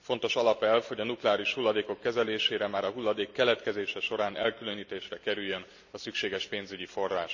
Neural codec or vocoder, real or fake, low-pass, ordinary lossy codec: none; real; 7.2 kHz; none